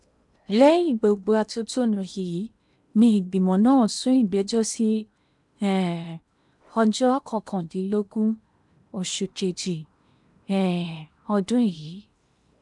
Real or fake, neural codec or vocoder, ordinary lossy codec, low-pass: fake; codec, 16 kHz in and 24 kHz out, 0.8 kbps, FocalCodec, streaming, 65536 codes; none; 10.8 kHz